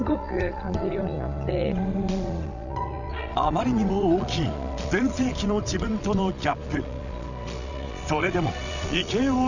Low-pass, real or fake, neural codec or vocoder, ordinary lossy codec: 7.2 kHz; fake; vocoder, 22.05 kHz, 80 mel bands, Vocos; none